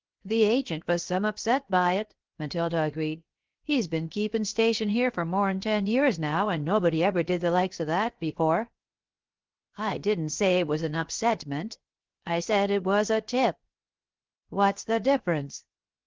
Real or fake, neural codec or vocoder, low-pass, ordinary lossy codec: fake; codec, 16 kHz, 0.7 kbps, FocalCodec; 7.2 kHz; Opus, 16 kbps